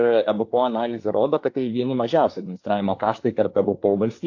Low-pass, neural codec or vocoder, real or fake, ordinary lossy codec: 7.2 kHz; codec, 24 kHz, 1 kbps, SNAC; fake; AAC, 48 kbps